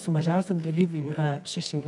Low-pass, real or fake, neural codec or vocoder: 10.8 kHz; fake; codec, 24 kHz, 0.9 kbps, WavTokenizer, medium music audio release